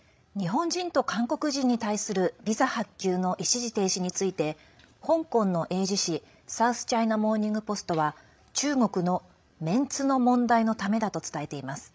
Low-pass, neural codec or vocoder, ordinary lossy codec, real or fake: none; codec, 16 kHz, 16 kbps, FreqCodec, larger model; none; fake